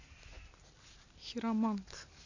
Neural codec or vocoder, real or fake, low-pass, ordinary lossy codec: none; real; 7.2 kHz; none